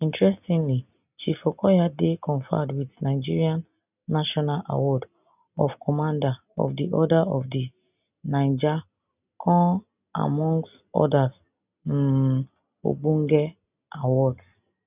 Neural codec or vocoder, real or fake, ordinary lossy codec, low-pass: none; real; none; 3.6 kHz